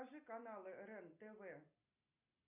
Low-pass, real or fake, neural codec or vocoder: 3.6 kHz; real; none